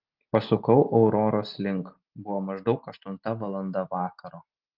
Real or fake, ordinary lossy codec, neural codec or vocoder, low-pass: real; Opus, 32 kbps; none; 5.4 kHz